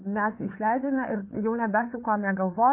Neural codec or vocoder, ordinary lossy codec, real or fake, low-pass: codec, 16 kHz, 4 kbps, FreqCodec, larger model; MP3, 24 kbps; fake; 3.6 kHz